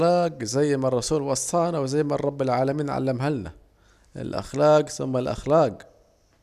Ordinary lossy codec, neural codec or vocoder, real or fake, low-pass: none; none; real; 14.4 kHz